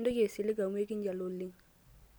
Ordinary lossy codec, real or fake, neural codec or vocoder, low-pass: none; real; none; none